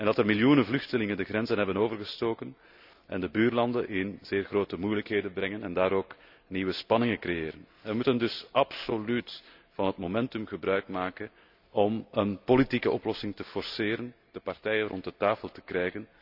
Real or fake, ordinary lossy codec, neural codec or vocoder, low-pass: real; none; none; 5.4 kHz